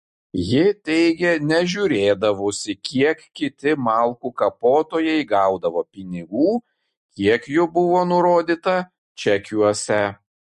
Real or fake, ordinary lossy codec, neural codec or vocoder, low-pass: real; MP3, 48 kbps; none; 14.4 kHz